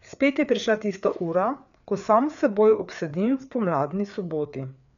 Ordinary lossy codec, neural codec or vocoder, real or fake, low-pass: none; codec, 16 kHz, 4 kbps, FreqCodec, larger model; fake; 7.2 kHz